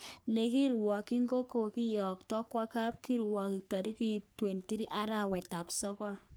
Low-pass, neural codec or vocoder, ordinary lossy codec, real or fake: none; codec, 44.1 kHz, 3.4 kbps, Pupu-Codec; none; fake